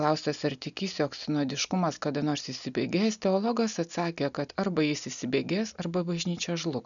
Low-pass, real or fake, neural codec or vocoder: 7.2 kHz; real; none